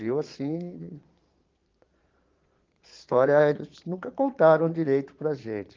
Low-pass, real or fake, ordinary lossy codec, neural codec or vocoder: 7.2 kHz; fake; Opus, 32 kbps; vocoder, 22.05 kHz, 80 mel bands, Vocos